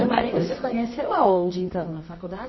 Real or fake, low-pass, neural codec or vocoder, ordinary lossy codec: fake; 7.2 kHz; codec, 24 kHz, 0.9 kbps, WavTokenizer, medium music audio release; MP3, 24 kbps